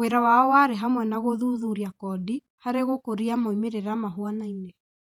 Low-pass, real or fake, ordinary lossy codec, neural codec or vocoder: 19.8 kHz; fake; none; vocoder, 48 kHz, 128 mel bands, Vocos